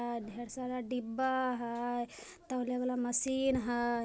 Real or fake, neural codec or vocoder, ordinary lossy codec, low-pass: real; none; none; none